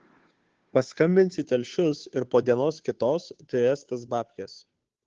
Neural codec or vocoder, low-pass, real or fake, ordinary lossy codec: codec, 16 kHz, 2 kbps, FunCodec, trained on Chinese and English, 25 frames a second; 7.2 kHz; fake; Opus, 16 kbps